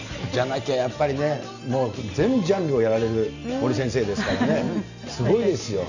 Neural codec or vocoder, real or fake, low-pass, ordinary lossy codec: none; real; 7.2 kHz; none